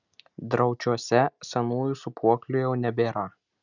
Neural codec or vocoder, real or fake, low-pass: none; real; 7.2 kHz